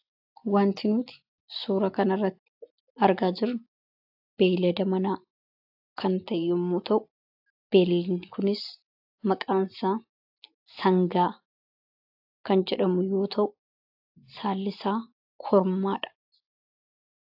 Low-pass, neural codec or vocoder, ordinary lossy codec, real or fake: 5.4 kHz; none; AAC, 48 kbps; real